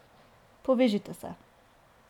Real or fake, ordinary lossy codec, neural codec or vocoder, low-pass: real; none; none; 19.8 kHz